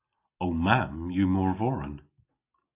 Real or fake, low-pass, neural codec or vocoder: real; 3.6 kHz; none